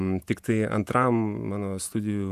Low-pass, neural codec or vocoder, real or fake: 14.4 kHz; vocoder, 48 kHz, 128 mel bands, Vocos; fake